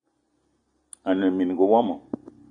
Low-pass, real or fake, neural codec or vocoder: 9.9 kHz; real; none